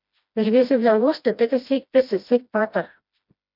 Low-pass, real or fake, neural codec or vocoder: 5.4 kHz; fake; codec, 16 kHz, 1 kbps, FreqCodec, smaller model